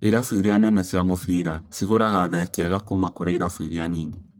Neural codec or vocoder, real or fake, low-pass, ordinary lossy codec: codec, 44.1 kHz, 1.7 kbps, Pupu-Codec; fake; none; none